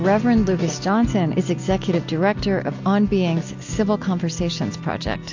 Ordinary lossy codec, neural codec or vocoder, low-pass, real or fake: AAC, 48 kbps; none; 7.2 kHz; real